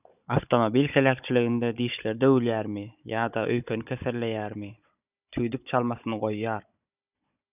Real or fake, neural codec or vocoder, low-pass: fake; codec, 16 kHz, 16 kbps, FunCodec, trained on Chinese and English, 50 frames a second; 3.6 kHz